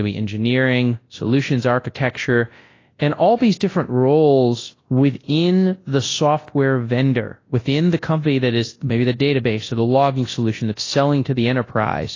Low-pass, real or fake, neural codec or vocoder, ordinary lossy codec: 7.2 kHz; fake; codec, 24 kHz, 0.9 kbps, WavTokenizer, large speech release; AAC, 32 kbps